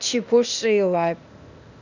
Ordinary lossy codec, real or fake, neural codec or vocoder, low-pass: none; fake; codec, 16 kHz, 0.8 kbps, ZipCodec; 7.2 kHz